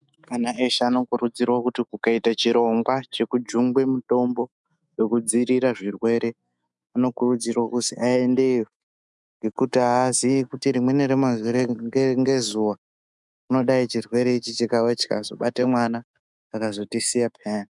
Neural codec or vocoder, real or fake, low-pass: autoencoder, 48 kHz, 128 numbers a frame, DAC-VAE, trained on Japanese speech; fake; 10.8 kHz